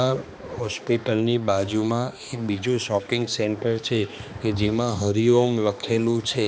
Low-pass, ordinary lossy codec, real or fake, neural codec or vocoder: none; none; fake; codec, 16 kHz, 2 kbps, X-Codec, HuBERT features, trained on balanced general audio